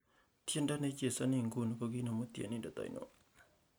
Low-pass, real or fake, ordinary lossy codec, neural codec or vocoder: none; real; none; none